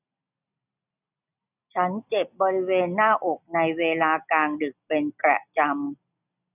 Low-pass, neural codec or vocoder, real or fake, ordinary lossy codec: 3.6 kHz; none; real; none